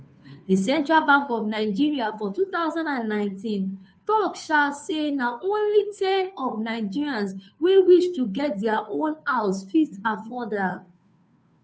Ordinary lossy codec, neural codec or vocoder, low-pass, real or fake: none; codec, 16 kHz, 2 kbps, FunCodec, trained on Chinese and English, 25 frames a second; none; fake